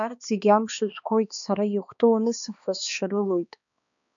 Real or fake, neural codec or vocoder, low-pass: fake; codec, 16 kHz, 2 kbps, X-Codec, HuBERT features, trained on balanced general audio; 7.2 kHz